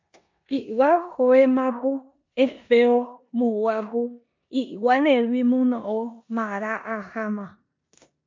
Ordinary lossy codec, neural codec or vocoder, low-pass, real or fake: MP3, 48 kbps; codec, 16 kHz in and 24 kHz out, 0.9 kbps, LongCat-Audio-Codec, four codebook decoder; 7.2 kHz; fake